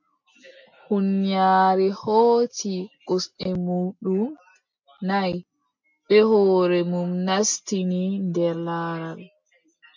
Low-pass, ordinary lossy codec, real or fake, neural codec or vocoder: 7.2 kHz; MP3, 48 kbps; real; none